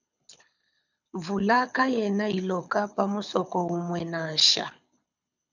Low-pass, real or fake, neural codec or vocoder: 7.2 kHz; fake; codec, 24 kHz, 6 kbps, HILCodec